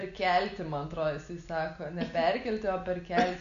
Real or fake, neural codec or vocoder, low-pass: real; none; 7.2 kHz